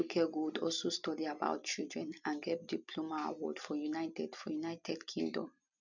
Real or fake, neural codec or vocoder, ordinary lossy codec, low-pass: real; none; none; 7.2 kHz